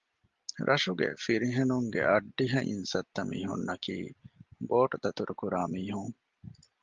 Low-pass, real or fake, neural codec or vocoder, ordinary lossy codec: 7.2 kHz; real; none; Opus, 32 kbps